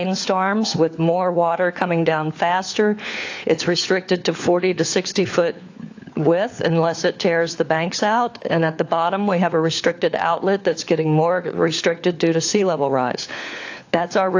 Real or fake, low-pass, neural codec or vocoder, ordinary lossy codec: fake; 7.2 kHz; codec, 16 kHz, 4 kbps, FunCodec, trained on LibriTTS, 50 frames a second; AAC, 48 kbps